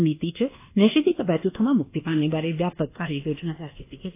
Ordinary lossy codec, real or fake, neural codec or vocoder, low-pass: AAC, 24 kbps; fake; codec, 24 kHz, 1.2 kbps, DualCodec; 3.6 kHz